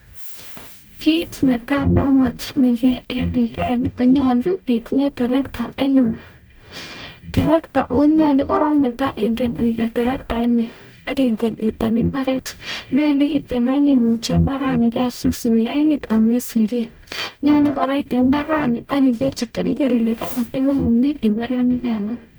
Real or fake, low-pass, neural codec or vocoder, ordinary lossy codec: fake; none; codec, 44.1 kHz, 0.9 kbps, DAC; none